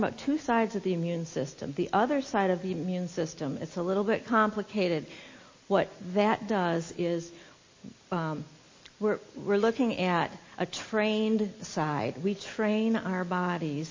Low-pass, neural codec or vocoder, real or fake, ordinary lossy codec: 7.2 kHz; none; real; MP3, 32 kbps